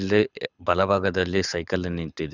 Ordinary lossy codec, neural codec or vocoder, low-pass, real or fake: none; codec, 24 kHz, 6 kbps, HILCodec; 7.2 kHz; fake